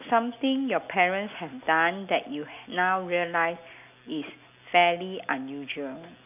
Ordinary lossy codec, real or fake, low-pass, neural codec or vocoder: none; real; 3.6 kHz; none